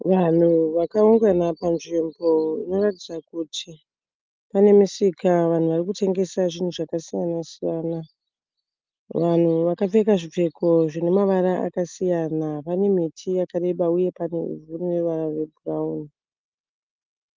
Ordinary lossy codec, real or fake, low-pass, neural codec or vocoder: Opus, 32 kbps; real; 7.2 kHz; none